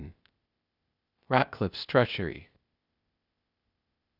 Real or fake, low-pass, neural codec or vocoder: fake; 5.4 kHz; codec, 16 kHz, 0.8 kbps, ZipCodec